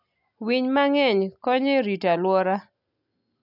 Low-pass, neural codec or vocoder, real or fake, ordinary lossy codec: 5.4 kHz; none; real; none